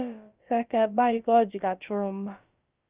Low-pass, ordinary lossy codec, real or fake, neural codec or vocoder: 3.6 kHz; Opus, 24 kbps; fake; codec, 16 kHz, about 1 kbps, DyCAST, with the encoder's durations